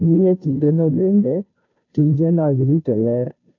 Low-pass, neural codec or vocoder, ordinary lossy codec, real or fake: 7.2 kHz; codec, 16 kHz, 0.5 kbps, FunCodec, trained on Chinese and English, 25 frames a second; none; fake